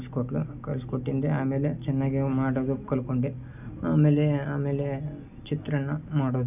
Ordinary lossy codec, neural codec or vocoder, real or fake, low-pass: none; none; real; 3.6 kHz